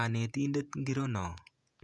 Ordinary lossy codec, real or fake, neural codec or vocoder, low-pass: none; fake; vocoder, 48 kHz, 128 mel bands, Vocos; 10.8 kHz